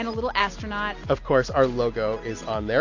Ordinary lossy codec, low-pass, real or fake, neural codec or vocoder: AAC, 48 kbps; 7.2 kHz; real; none